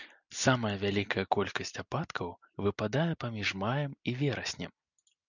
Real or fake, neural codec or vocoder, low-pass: real; none; 7.2 kHz